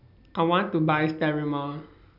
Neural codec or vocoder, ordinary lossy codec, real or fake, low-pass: none; none; real; 5.4 kHz